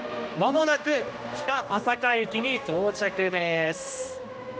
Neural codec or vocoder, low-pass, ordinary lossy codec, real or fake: codec, 16 kHz, 1 kbps, X-Codec, HuBERT features, trained on general audio; none; none; fake